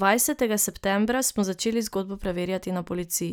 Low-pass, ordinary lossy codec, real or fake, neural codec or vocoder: none; none; real; none